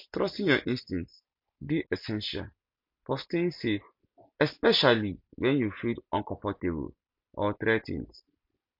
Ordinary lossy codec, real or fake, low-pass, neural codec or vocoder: MP3, 48 kbps; real; 5.4 kHz; none